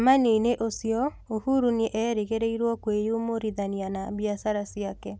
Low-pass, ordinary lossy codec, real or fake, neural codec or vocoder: none; none; real; none